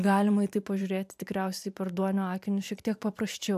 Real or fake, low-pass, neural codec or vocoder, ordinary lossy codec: fake; 14.4 kHz; vocoder, 44.1 kHz, 128 mel bands every 512 samples, BigVGAN v2; AAC, 96 kbps